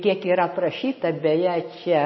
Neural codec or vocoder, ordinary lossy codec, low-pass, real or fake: none; MP3, 24 kbps; 7.2 kHz; real